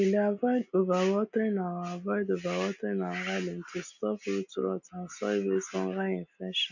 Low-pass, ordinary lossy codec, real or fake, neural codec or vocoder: 7.2 kHz; none; real; none